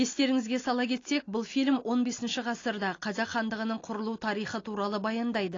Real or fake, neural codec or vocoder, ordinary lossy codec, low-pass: real; none; AAC, 32 kbps; 7.2 kHz